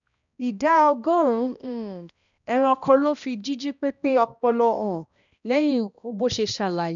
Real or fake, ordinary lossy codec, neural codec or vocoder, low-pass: fake; none; codec, 16 kHz, 1 kbps, X-Codec, HuBERT features, trained on balanced general audio; 7.2 kHz